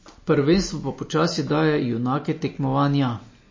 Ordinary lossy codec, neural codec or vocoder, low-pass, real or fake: MP3, 32 kbps; none; 7.2 kHz; real